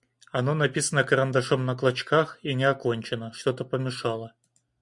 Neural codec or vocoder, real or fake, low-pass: none; real; 10.8 kHz